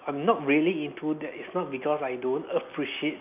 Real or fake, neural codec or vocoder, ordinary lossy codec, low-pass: real; none; none; 3.6 kHz